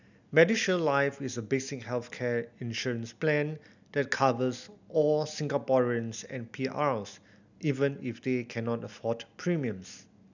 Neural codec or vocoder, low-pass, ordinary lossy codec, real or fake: none; 7.2 kHz; none; real